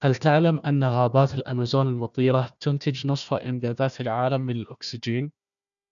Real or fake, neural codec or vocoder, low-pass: fake; codec, 16 kHz, 1 kbps, FunCodec, trained on Chinese and English, 50 frames a second; 7.2 kHz